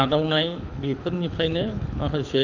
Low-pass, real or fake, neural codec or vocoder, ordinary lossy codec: 7.2 kHz; fake; codec, 24 kHz, 6 kbps, HILCodec; none